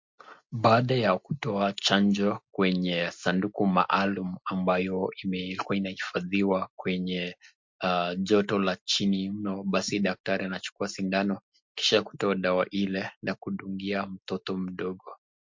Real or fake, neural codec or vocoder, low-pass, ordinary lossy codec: real; none; 7.2 kHz; MP3, 48 kbps